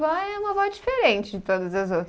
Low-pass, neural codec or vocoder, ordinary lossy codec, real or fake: none; none; none; real